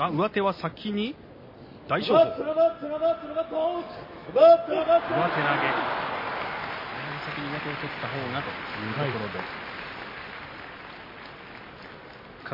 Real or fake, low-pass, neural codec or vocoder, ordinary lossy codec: real; 5.4 kHz; none; MP3, 24 kbps